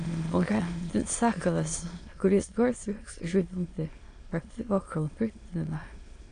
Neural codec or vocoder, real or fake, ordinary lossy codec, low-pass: autoencoder, 22.05 kHz, a latent of 192 numbers a frame, VITS, trained on many speakers; fake; AAC, 48 kbps; 9.9 kHz